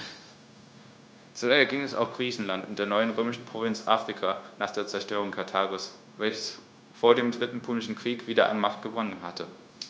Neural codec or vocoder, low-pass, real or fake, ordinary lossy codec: codec, 16 kHz, 0.9 kbps, LongCat-Audio-Codec; none; fake; none